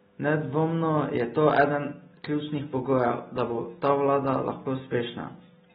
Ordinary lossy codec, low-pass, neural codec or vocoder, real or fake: AAC, 16 kbps; 19.8 kHz; none; real